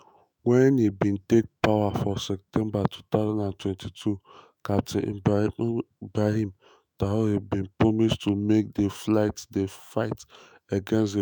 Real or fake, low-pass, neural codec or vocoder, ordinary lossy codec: fake; none; autoencoder, 48 kHz, 128 numbers a frame, DAC-VAE, trained on Japanese speech; none